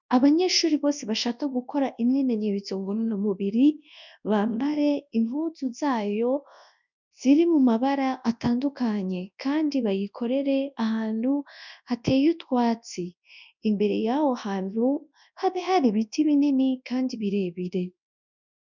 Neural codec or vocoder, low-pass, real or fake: codec, 24 kHz, 0.9 kbps, WavTokenizer, large speech release; 7.2 kHz; fake